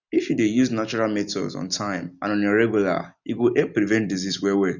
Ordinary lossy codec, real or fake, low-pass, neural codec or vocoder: none; real; 7.2 kHz; none